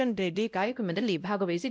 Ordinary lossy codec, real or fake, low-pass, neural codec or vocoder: none; fake; none; codec, 16 kHz, 0.5 kbps, X-Codec, WavLM features, trained on Multilingual LibriSpeech